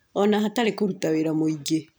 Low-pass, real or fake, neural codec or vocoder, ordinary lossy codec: none; real; none; none